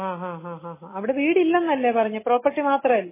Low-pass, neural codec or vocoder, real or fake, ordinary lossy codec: 3.6 kHz; none; real; MP3, 16 kbps